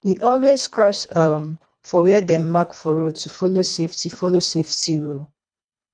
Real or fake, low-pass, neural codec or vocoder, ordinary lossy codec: fake; 9.9 kHz; codec, 24 kHz, 1.5 kbps, HILCodec; none